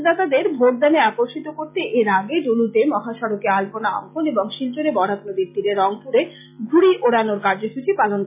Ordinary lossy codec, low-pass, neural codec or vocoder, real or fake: MP3, 32 kbps; 3.6 kHz; none; real